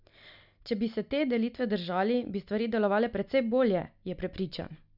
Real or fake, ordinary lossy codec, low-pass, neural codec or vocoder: real; none; 5.4 kHz; none